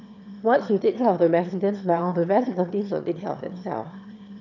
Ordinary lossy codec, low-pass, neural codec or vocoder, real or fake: none; 7.2 kHz; autoencoder, 22.05 kHz, a latent of 192 numbers a frame, VITS, trained on one speaker; fake